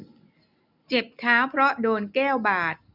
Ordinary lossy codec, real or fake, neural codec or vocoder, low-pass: none; real; none; 5.4 kHz